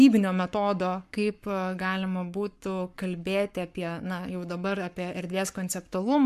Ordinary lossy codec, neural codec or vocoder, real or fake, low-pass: MP3, 96 kbps; codec, 44.1 kHz, 7.8 kbps, Pupu-Codec; fake; 14.4 kHz